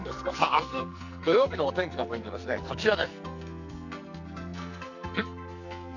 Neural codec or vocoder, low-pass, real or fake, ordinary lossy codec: codec, 44.1 kHz, 2.6 kbps, SNAC; 7.2 kHz; fake; none